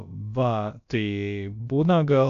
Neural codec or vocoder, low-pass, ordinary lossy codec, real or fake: codec, 16 kHz, about 1 kbps, DyCAST, with the encoder's durations; 7.2 kHz; MP3, 96 kbps; fake